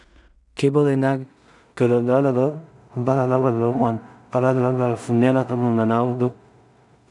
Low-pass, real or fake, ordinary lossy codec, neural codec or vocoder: 10.8 kHz; fake; none; codec, 16 kHz in and 24 kHz out, 0.4 kbps, LongCat-Audio-Codec, two codebook decoder